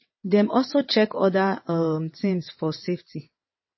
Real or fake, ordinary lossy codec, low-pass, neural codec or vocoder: fake; MP3, 24 kbps; 7.2 kHz; vocoder, 22.05 kHz, 80 mel bands, WaveNeXt